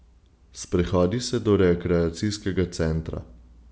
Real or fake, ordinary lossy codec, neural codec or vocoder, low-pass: real; none; none; none